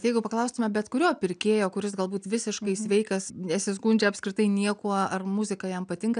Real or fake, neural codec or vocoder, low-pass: real; none; 9.9 kHz